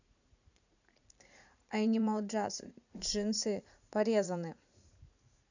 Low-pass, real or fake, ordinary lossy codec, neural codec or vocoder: 7.2 kHz; fake; none; vocoder, 44.1 kHz, 80 mel bands, Vocos